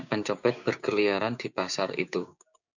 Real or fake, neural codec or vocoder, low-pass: fake; codec, 16 kHz, 6 kbps, DAC; 7.2 kHz